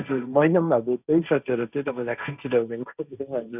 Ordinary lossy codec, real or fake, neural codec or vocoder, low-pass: none; fake; codec, 16 kHz, 1.1 kbps, Voila-Tokenizer; 3.6 kHz